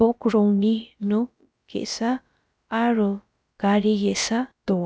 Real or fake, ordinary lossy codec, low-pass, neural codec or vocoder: fake; none; none; codec, 16 kHz, about 1 kbps, DyCAST, with the encoder's durations